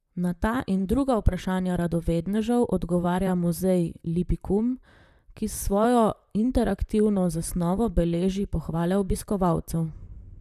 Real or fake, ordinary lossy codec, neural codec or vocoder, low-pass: fake; none; vocoder, 44.1 kHz, 128 mel bands, Pupu-Vocoder; 14.4 kHz